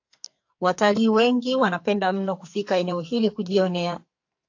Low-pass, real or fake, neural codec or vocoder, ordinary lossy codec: 7.2 kHz; fake; codec, 44.1 kHz, 2.6 kbps, SNAC; AAC, 48 kbps